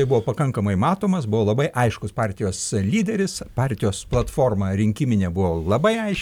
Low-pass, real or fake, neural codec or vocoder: 19.8 kHz; real; none